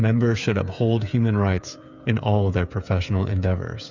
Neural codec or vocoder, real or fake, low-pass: codec, 16 kHz, 8 kbps, FreqCodec, smaller model; fake; 7.2 kHz